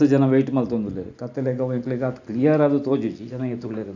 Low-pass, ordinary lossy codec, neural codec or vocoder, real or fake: 7.2 kHz; none; none; real